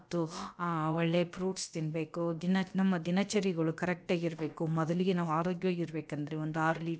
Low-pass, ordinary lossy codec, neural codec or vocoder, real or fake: none; none; codec, 16 kHz, about 1 kbps, DyCAST, with the encoder's durations; fake